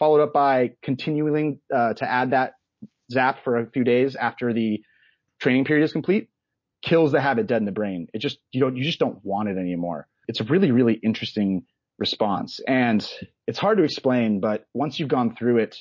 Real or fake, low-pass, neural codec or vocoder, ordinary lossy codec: real; 7.2 kHz; none; MP3, 32 kbps